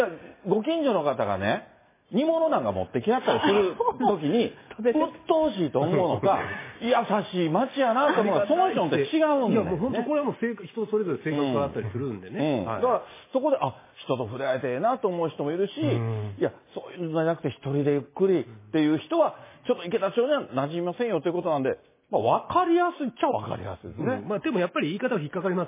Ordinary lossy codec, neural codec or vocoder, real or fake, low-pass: MP3, 16 kbps; none; real; 3.6 kHz